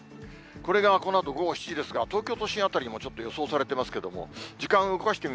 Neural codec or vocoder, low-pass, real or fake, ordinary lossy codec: none; none; real; none